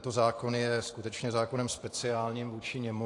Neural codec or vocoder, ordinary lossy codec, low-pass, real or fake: vocoder, 44.1 kHz, 128 mel bands every 512 samples, BigVGAN v2; AAC, 48 kbps; 10.8 kHz; fake